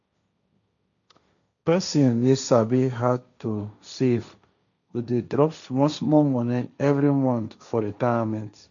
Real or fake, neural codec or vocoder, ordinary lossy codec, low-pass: fake; codec, 16 kHz, 1.1 kbps, Voila-Tokenizer; none; 7.2 kHz